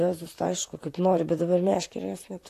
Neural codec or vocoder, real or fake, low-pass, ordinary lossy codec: vocoder, 44.1 kHz, 128 mel bands, Pupu-Vocoder; fake; 14.4 kHz; AAC, 64 kbps